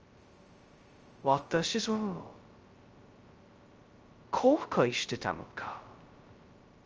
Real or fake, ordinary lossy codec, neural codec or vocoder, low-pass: fake; Opus, 24 kbps; codec, 16 kHz, 0.2 kbps, FocalCodec; 7.2 kHz